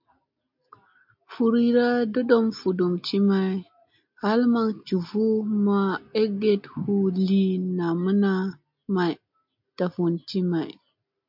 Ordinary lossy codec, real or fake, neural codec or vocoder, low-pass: MP3, 48 kbps; real; none; 5.4 kHz